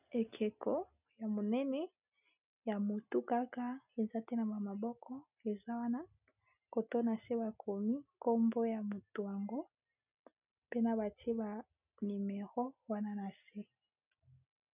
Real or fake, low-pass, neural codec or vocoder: real; 3.6 kHz; none